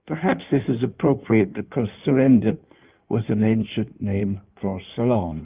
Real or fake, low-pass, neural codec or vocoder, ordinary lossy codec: fake; 3.6 kHz; codec, 16 kHz in and 24 kHz out, 1.1 kbps, FireRedTTS-2 codec; Opus, 24 kbps